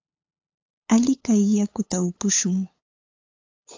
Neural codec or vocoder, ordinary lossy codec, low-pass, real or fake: codec, 16 kHz, 8 kbps, FunCodec, trained on LibriTTS, 25 frames a second; AAC, 48 kbps; 7.2 kHz; fake